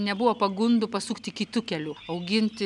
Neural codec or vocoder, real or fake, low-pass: none; real; 10.8 kHz